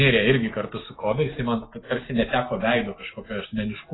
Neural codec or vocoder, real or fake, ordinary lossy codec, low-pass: none; real; AAC, 16 kbps; 7.2 kHz